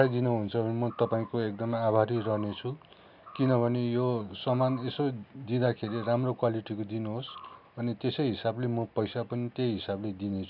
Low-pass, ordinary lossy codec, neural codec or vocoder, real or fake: 5.4 kHz; none; none; real